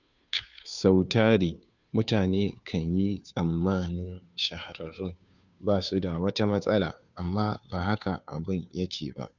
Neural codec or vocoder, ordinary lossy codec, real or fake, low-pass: codec, 16 kHz, 2 kbps, FunCodec, trained on Chinese and English, 25 frames a second; none; fake; 7.2 kHz